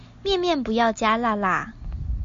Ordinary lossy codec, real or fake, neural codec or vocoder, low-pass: MP3, 48 kbps; real; none; 7.2 kHz